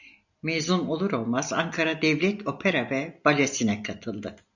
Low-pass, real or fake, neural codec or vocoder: 7.2 kHz; real; none